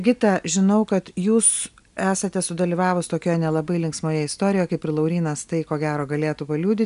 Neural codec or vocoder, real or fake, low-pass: none; real; 10.8 kHz